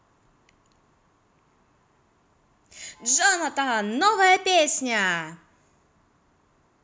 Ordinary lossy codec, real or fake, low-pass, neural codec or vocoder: none; real; none; none